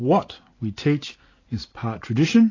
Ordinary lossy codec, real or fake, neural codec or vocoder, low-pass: AAC, 32 kbps; real; none; 7.2 kHz